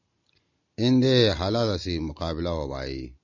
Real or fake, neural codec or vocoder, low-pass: real; none; 7.2 kHz